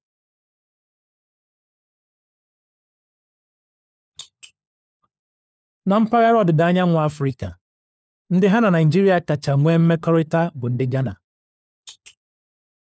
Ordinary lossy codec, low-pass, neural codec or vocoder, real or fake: none; none; codec, 16 kHz, 4 kbps, FunCodec, trained on LibriTTS, 50 frames a second; fake